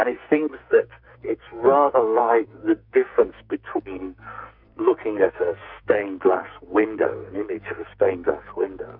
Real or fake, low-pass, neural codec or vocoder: fake; 5.4 kHz; codec, 44.1 kHz, 2.6 kbps, SNAC